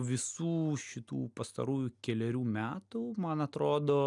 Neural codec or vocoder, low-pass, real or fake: none; 10.8 kHz; real